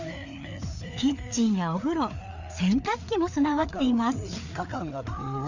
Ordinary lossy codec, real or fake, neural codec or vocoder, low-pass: none; fake; codec, 16 kHz, 4 kbps, FreqCodec, larger model; 7.2 kHz